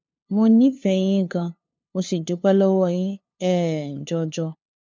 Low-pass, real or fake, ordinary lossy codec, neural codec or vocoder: none; fake; none; codec, 16 kHz, 2 kbps, FunCodec, trained on LibriTTS, 25 frames a second